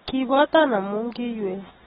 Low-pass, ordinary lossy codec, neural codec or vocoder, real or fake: 7.2 kHz; AAC, 16 kbps; none; real